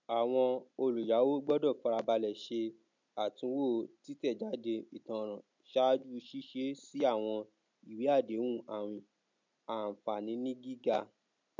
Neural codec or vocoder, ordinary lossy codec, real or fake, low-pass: none; MP3, 64 kbps; real; 7.2 kHz